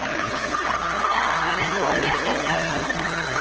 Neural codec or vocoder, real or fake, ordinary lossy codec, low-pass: codec, 16 kHz, 8 kbps, FunCodec, trained on LibriTTS, 25 frames a second; fake; Opus, 16 kbps; 7.2 kHz